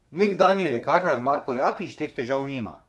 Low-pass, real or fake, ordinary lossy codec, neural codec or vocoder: none; fake; none; codec, 24 kHz, 1 kbps, SNAC